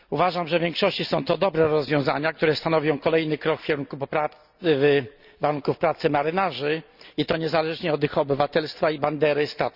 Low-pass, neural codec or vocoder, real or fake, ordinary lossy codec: 5.4 kHz; none; real; Opus, 64 kbps